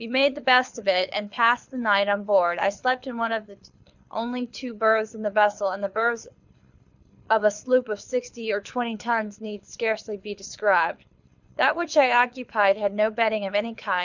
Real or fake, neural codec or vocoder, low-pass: fake; codec, 24 kHz, 6 kbps, HILCodec; 7.2 kHz